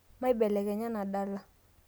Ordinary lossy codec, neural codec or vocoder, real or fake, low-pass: none; none; real; none